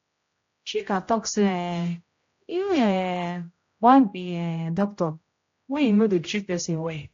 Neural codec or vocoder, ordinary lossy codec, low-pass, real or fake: codec, 16 kHz, 0.5 kbps, X-Codec, HuBERT features, trained on general audio; AAC, 48 kbps; 7.2 kHz; fake